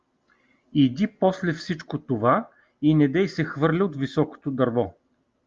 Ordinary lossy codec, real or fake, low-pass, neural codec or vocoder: Opus, 32 kbps; real; 7.2 kHz; none